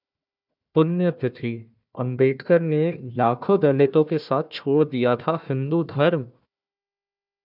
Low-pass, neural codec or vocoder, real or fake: 5.4 kHz; codec, 16 kHz, 1 kbps, FunCodec, trained on Chinese and English, 50 frames a second; fake